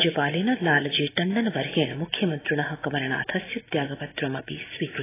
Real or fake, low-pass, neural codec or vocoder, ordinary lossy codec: real; 3.6 kHz; none; AAC, 16 kbps